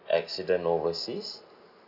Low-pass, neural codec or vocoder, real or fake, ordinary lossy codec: 5.4 kHz; none; real; none